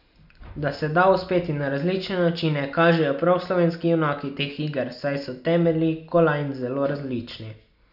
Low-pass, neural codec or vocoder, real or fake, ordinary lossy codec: 5.4 kHz; none; real; none